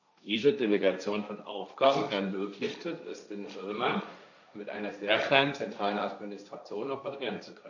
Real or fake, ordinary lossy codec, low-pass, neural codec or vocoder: fake; none; 7.2 kHz; codec, 16 kHz, 1.1 kbps, Voila-Tokenizer